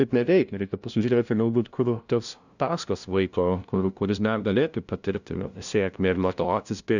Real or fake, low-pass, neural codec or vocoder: fake; 7.2 kHz; codec, 16 kHz, 0.5 kbps, FunCodec, trained on LibriTTS, 25 frames a second